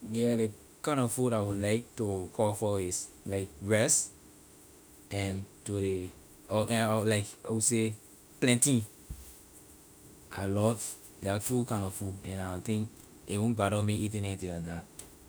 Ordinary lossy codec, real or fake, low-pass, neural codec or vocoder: none; fake; none; autoencoder, 48 kHz, 32 numbers a frame, DAC-VAE, trained on Japanese speech